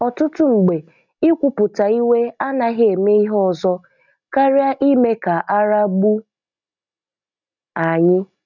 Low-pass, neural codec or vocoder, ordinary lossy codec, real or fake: 7.2 kHz; none; none; real